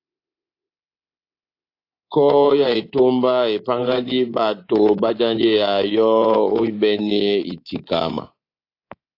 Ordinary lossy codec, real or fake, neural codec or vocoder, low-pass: AAC, 32 kbps; fake; codec, 24 kHz, 3.1 kbps, DualCodec; 5.4 kHz